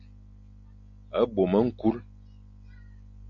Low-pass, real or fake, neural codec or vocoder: 7.2 kHz; real; none